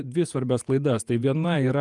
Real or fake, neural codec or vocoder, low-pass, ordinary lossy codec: fake; vocoder, 48 kHz, 128 mel bands, Vocos; 10.8 kHz; Opus, 32 kbps